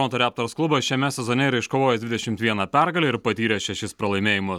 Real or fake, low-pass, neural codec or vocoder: real; 19.8 kHz; none